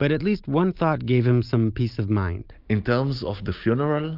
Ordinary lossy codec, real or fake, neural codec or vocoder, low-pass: Opus, 32 kbps; real; none; 5.4 kHz